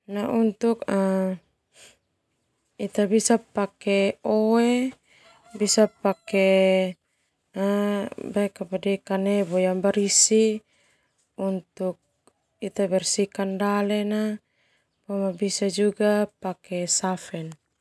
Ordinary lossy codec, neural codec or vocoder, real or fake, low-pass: none; none; real; none